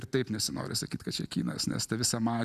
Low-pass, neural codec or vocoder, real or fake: 14.4 kHz; none; real